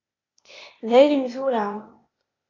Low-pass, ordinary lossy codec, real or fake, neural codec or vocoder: 7.2 kHz; AAC, 32 kbps; fake; codec, 16 kHz, 0.8 kbps, ZipCodec